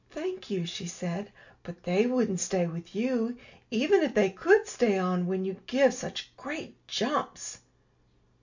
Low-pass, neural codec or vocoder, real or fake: 7.2 kHz; none; real